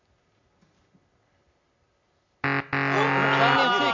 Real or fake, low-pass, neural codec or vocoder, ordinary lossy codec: real; 7.2 kHz; none; MP3, 64 kbps